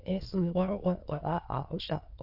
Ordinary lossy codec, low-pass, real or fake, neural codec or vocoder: AAC, 32 kbps; 5.4 kHz; fake; autoencoder, 22.05 kHz, a latent of 192 numbers a frame, VITS, trained on many speakers